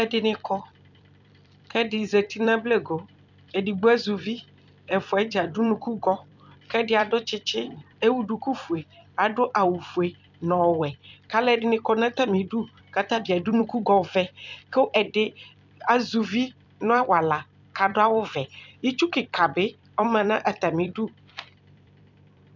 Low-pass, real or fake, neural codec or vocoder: 7.2 kHz; fake; vocoder, 44.1 kHz, 128 mel bands every 256 samples, BigVGAN v2